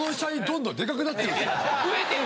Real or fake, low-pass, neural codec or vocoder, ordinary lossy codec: real; none; none; none